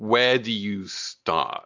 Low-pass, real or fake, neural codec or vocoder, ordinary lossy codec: 7.2 kHz; real; none; AAC, 48 kbps